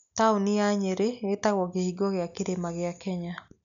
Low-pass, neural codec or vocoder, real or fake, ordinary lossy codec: 7.2 kHz; none; real; none